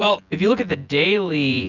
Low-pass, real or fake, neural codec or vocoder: 7.2 kHz; fake; vocoder, 24 kHz, 100 mel bands, Vocos